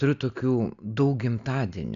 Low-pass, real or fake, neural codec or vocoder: 7.2 kHz; real; none